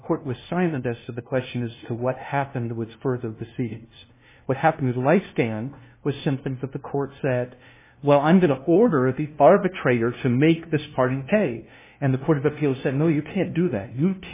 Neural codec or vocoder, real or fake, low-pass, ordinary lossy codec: codec, 16 kHz, 0.5 kbps, FunCodec, trained on LibriTTS, 25 frames a second; fake; 3.6 kHz; MP3, 16 kbps